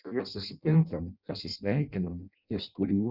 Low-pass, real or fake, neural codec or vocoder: 5.4 kHz; fake; codec, 16 kHz in and 24 kHz out, 0.6 kbps, FireRedTTS-2 codec